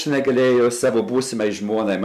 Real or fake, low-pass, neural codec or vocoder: real; 14.4 kHz; none